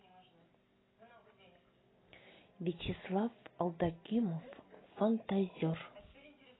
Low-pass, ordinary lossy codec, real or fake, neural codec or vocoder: 7.2 kHz; AAC, 16 kbps; real; none